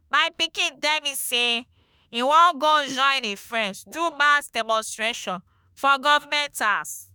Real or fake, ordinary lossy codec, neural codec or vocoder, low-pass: fake; none; autoencoder, 48 kHz, 32 numbers a frame, DAC-VAE, trained on Japanese speech; none